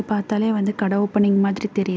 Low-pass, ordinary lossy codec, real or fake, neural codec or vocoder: none; none; real; none